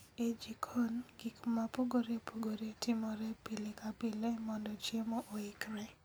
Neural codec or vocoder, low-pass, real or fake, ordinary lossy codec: none; none; real; none